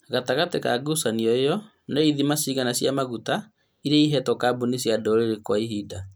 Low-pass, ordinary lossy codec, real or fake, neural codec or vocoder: none; none; real; none